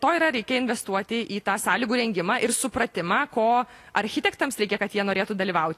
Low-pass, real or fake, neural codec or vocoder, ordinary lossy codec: 14.4 kHz; real; none; AAC, 48 kbps